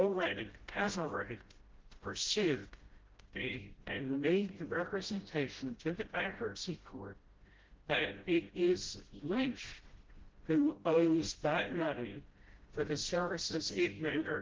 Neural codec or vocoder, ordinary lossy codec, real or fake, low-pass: codec, 16 kHz, 0.5 kbps, FreqCodec, smaller model; Opus, 16 kbps; fake; 7.2 kHz